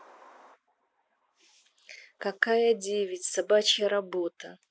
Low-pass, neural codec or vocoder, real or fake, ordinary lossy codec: none; none; real; none